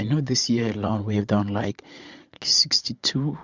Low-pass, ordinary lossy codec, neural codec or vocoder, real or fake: 7.2 kHz; Opus, 64 kbps; vocoder, 44.1 kHz, 80 mel bands, Vocos; fake